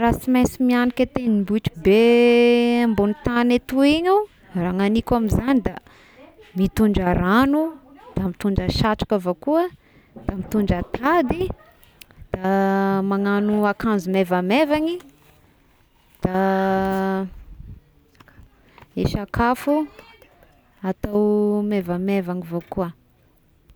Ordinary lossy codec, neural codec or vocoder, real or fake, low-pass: none; none; real; none